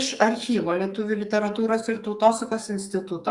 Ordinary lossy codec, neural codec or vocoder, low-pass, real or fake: Opus, 64 kbps; codec, 44.1 kHz, 2.6 kbps, SNAC; 10.8 kHz; fake